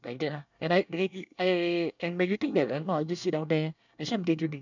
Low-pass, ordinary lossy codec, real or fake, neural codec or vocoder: 7.2 kHz; none; fake; codec, 24 kHz, 1 kbps, SNAC